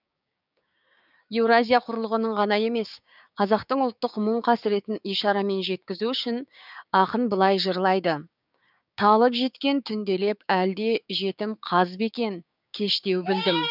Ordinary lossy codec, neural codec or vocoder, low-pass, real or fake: none; codec, 16 kHz, 6 kbps, DAC; 5.4 kHz; fake